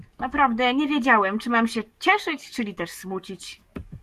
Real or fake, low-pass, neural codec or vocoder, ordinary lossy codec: fake; 14.4 kHz; codec, 44.1 kHz, 7.8 kbps, DAC; Opus, 64 kbps